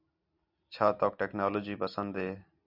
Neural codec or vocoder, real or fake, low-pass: none; real; 5.4 kHz